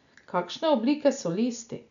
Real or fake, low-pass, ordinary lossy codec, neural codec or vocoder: real; 7.2 kHz; none; none